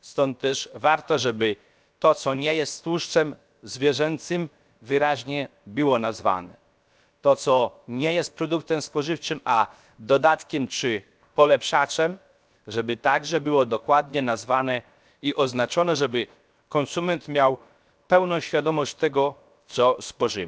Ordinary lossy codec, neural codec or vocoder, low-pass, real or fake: none; codec, 16 kHz, 0.7 kbps, FocalCodec; none; fake